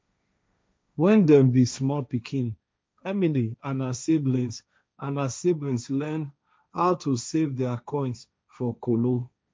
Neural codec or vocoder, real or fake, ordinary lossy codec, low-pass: codec, 16 kHz, 1.1 kbps, Voila-Tokenizer; fake; none; none